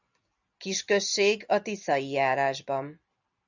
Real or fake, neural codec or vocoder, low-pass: real; none; 7.2 kHz